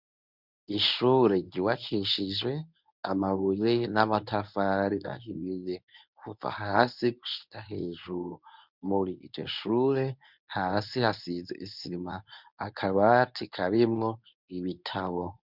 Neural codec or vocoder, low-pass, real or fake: codec, 24 kHz, 0.9 kbps, WavTokenizer, medium speech release version 1; 5.4 kHz; fake